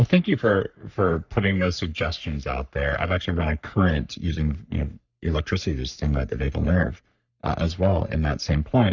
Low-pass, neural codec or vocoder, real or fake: 7.2 kHz; codec, 44.1 kHz, 3.4 kbps, Pupu-Codec; fake